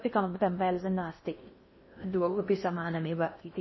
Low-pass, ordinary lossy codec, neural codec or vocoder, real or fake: 7.2 kHz; MP3, 24 kbps; codec, 16 kHz in and 24 kHz out, 0.6 kbps, FocalCodec, streaming, 4096 codes; fake